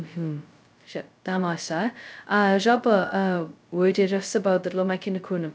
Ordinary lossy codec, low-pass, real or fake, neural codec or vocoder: none; none; fake; codec, 16 kHz, 0.2 kbps, FocalCodec